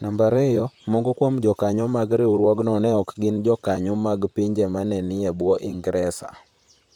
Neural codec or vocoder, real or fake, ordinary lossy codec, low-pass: vocoder, 44.1 kHz, 128 mel bands, Pupu-Vocoder; fake; MP3, 96 kbps; 19.8 kHz